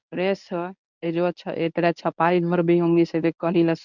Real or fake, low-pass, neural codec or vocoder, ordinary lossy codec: fake; 7.2 kHz; codec, 24 kHz, 0.9 kbps, WavTokenizer, medium speech release version 1; none